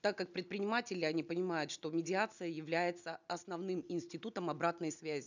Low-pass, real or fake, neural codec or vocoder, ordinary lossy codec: 7.2 kHz; real; none; none